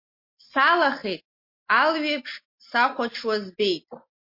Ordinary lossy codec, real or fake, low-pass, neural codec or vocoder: MP3, 32 kbps; real; 5.4 kHz; none